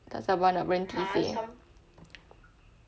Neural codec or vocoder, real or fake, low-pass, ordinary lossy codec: none; real; none; none